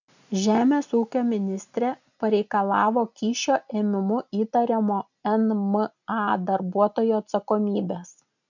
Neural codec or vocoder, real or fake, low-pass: none; real; 7.2 kHz